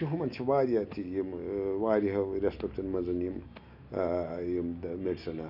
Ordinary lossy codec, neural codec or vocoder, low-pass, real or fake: none; none; 5.4 kHz; real